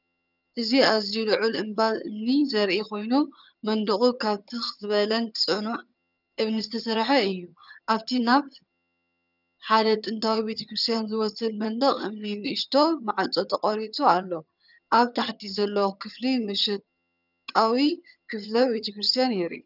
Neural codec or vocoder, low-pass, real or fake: vocoder, 22.05 kHz, 80 mel bands, HiFi-GAN; 5.4 kHz; fake